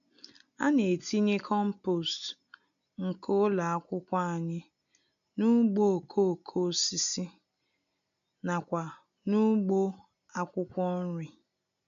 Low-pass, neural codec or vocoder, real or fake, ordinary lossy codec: 7.2 kHz; none; real; none